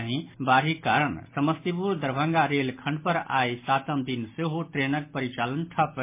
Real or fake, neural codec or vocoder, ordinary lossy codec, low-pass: real; none; MP3, 24 kbps; 3.6 kHz